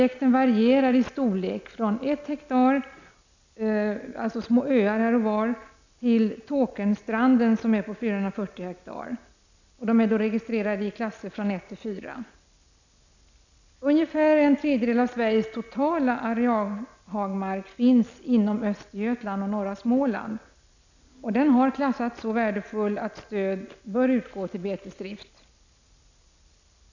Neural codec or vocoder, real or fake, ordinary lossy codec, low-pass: none; real; none; 7.2 kHz